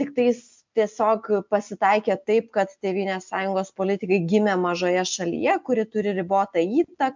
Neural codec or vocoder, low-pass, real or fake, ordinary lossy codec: none; 7.2 kHz; real; MP3, 64 kbps